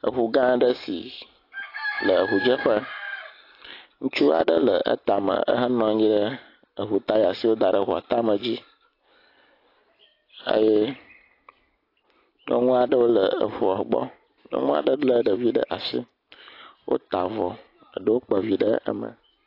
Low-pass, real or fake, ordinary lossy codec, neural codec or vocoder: 5.4 kHz; real; AAC, 32 kbps; none